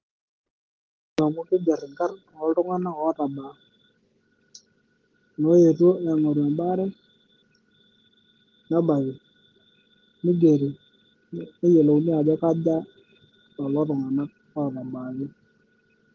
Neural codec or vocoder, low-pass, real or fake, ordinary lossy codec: none; 7.2 kHz; real; Opus, 16 kbps